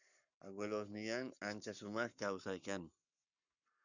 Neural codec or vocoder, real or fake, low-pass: codec, 44.1 kHz, 7.8 kbps, Pupu-Codec; fake; 7.2 kHz